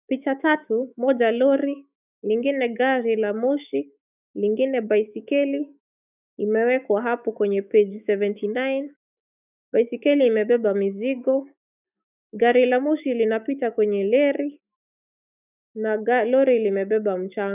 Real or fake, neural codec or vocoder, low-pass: fake; autoencoder, 48 kHz, 128 numbers a frame, DAC-VAE, trained on Japanese speech; 3.6 kHz